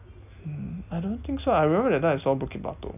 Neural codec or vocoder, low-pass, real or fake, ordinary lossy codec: none; 3.6 kHz; real; none